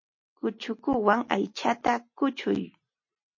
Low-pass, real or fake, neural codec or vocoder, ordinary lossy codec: 7.2 kHz; real; none; MP3, 32 kbps